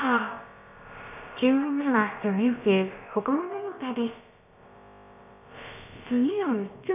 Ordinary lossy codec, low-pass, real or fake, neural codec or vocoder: none; 3.6 kHz; fake; codec, 16 kHz, about 1 kbps, DyCAST, with the encoder's durations